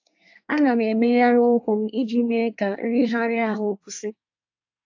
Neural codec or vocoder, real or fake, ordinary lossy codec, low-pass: codec, 24 kHz, 1 kbps, SNAC; fake; AAC, 48 kbps; 7.2 kHz